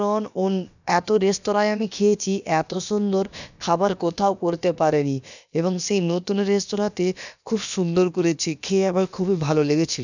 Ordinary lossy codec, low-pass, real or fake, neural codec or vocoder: none; 7.2 kHz; fake; codec, 16 kHz, about 1 kbps, DyCAST, with the encoder's durations